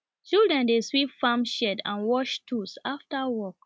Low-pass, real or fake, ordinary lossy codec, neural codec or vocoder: none; real; none; none